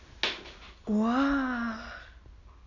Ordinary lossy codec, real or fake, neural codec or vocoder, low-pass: none; real; none; 7.2 kHz